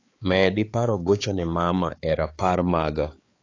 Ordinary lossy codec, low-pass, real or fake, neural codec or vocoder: AAC, 32 kbps; 7.2 kHz; fake; codec, 16 kHz, 4 kbps, X-Codec, HuBERT features, trained on balanced general audio